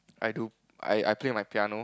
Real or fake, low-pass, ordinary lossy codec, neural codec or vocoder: real; none; none; none